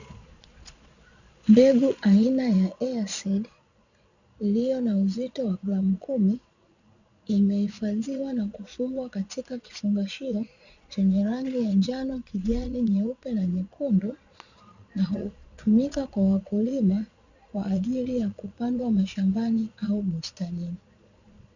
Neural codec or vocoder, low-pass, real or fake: vocoder, 22.05 kHz, 80 mel bands, Vocos; 7.2 kHz; fake